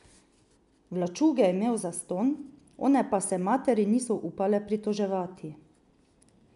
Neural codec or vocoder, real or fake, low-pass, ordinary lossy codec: none; real; 10.8 kHz; MP3, 96 kbps